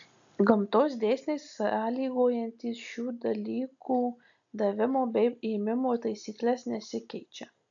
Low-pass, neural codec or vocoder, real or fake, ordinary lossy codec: 7.2 kHz; none; real; AAC, 64 kbps